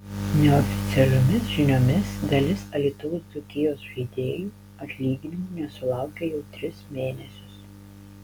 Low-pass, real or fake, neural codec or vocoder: 19.8 kHz; real; none